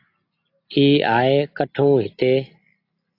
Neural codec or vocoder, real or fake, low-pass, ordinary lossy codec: none; real; 5.4 kHz; AAC, 32 kbps